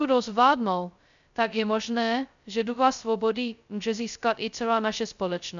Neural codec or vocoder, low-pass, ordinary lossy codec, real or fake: codec, 16 kHz, 0.2 kbps, FocalCodec; 7.2 kHz; MP3, 96 kbps; fake